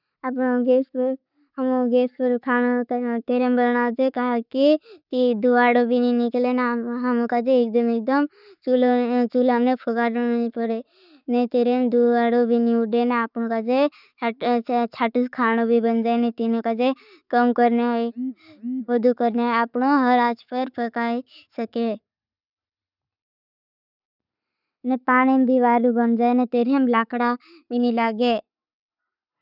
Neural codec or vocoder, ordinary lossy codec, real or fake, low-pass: none; none; real; 5.4 kHz